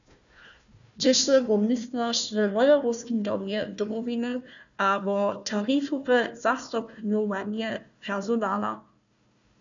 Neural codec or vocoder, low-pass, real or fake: codec, 16 kHz, 1 kbps, FunCodec, trained on Chinese and English, 50 frames a second; 7.2 kHz; fake